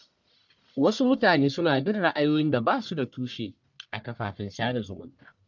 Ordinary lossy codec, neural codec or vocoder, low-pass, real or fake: none; codec, 44.1 kHz, 1.7 kbps, Pupu-Codec; 7.2 kHz; fake